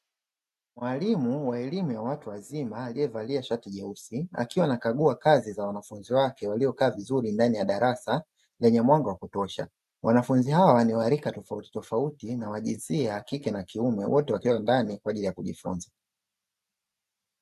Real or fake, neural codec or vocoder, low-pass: real; none; 14.4 kHz